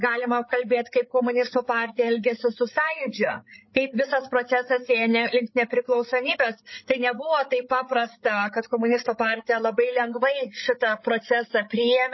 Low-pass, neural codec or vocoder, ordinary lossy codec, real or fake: 7.2 kHz; codec, 16 kHz, 16 kbps, FreqCodec, larger model; MP3, 24 kbps; fake